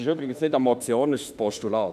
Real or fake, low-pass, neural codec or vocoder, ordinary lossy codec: fake; 14.4 kHz; autoencoder, 48 kHz, 32 numbers a frame, DAC-VAE, trained on Japanese speech; none